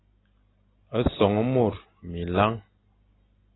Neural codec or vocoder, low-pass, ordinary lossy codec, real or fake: none; 7.2 kHz; AAC, 16 kbps; real